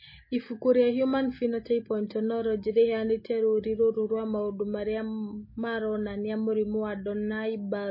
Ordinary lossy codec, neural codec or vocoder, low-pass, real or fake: MP3, 24 kbps; none; 5.4 kHz; real